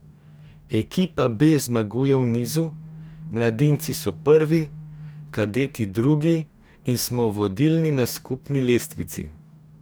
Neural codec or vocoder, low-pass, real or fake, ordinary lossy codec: codec, 44.1 kHz, 2.6 kbps, DAC; none; fake; none